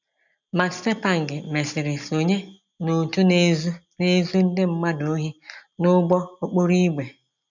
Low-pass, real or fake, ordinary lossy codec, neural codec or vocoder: 7.2 kHz; real; none; none